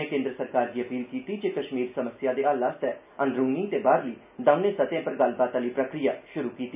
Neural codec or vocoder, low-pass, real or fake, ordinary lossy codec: none; 3.6 kHz; real; none